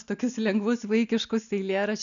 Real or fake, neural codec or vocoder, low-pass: real; none; 7.2 kHz